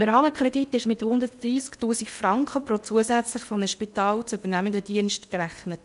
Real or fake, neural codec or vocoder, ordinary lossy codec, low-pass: fake; codec, 16 kHz in and 24 kHz out, 0.8 kbps, FocalCodec, streaming, 65536 codes; none; 10.8 kHz